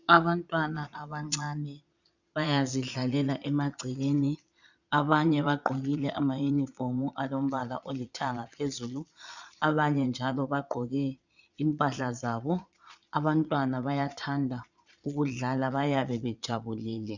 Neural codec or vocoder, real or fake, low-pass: vocoder, 22.05 kHz, 80 mel bands, Vocos; fake; 7.2 kHz